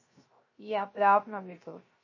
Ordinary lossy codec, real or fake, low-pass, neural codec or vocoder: MP3, 32 kbps; fake; 7.2 kHz; codec, 16 kHz, 0.3 kbps, FocalCodec